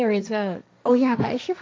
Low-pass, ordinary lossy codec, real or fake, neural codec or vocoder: none; none; fake; codec, 16 kHz, 1.1 kbps, Voila-Tokenizer